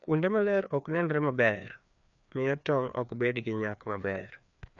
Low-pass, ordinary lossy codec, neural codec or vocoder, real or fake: 7.2 kHz; none; codec, 16 kHz, 2 kbps, FreqCodec, larger model; fake